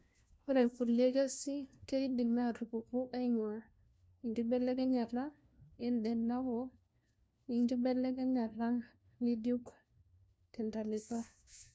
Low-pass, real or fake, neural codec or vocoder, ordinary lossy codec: none; fake; codec, 16 kHz, 1 kbps, FunCodec, trained on LibriTTS, 50 frames a second; none